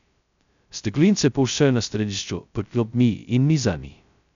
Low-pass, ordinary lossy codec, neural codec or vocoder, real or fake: 7.2 kHz; none; codec, 16 kHz, 0.2 kbps, FocalCodec; fake